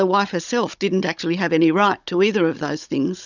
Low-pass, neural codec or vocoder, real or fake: 7.2 kHz; codec, 16 kHz, 16 kbps, FunCodec, trained on Chinese and English, 50 frames a second; fake